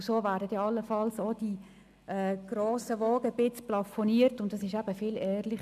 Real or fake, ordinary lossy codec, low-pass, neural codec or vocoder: real; none; 14.4 kHz; none